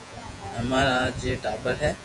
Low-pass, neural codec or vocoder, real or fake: 10.8 kHz; vocoder, 48 kHz, 128 mel bands, Vocos; fake